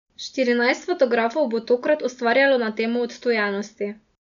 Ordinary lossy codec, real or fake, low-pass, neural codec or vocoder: none; real; 7.2 kHz; none